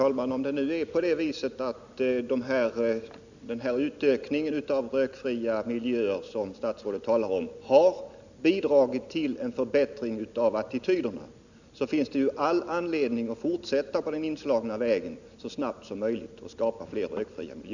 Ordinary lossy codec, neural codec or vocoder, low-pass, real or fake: none; none; 7.2 kHz; real